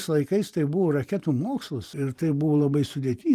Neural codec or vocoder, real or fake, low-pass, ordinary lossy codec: vocoder, 44.1 kHz, 128 mel bands every 512 samples, BigVGAN v2; fake; 14.4 kHz; Opus, 32 kbps